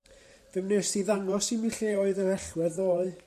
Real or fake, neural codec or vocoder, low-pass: fake; vocoder, 44.1 kHz, 128 mel bands every 512 samples, BigVGAN v2; 14.4 kHz